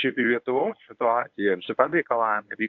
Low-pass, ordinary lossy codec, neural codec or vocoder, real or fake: 7.2 kHz; AAC, 48 kbps; codec, 24 kHz, 0.9 kbps, WavTokenizer, medium speech release version 1; fake